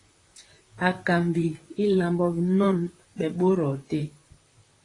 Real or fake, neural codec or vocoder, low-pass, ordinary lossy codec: fake; vocoder, 44.1 kHz, 128 mel bands, Pupu-Vocoder; 10.8 kHz; AAC, 32 kbps